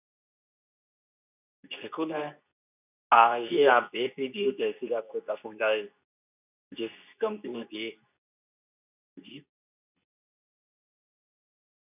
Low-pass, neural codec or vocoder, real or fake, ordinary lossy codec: 3.6 kHz; codec, 24 kHz, 0.9 kbps, WavTokenizer, medium speech release version 2; fake; none